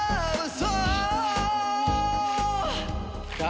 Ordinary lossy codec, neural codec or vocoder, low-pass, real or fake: none; none; none; real